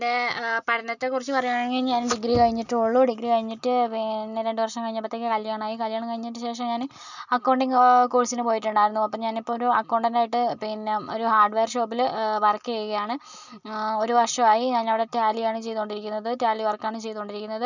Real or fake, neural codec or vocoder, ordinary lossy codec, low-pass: real; none; none; 7.2 kHz